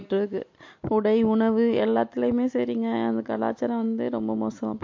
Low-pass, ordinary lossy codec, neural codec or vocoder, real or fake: 7.2 kHz; MP3, 48 kbps; none; real